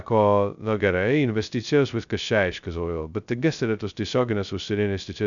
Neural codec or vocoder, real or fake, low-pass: codec, 16 kHz, 0.2 kbps, FocalCodec; fake; 7.2 kHz